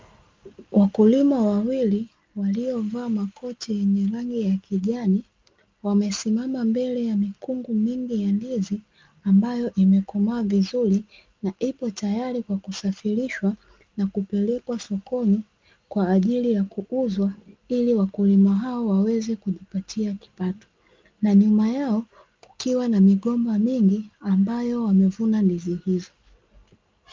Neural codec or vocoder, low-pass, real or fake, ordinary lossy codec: none; 7.2 kHz; real; Opus, 24 kbps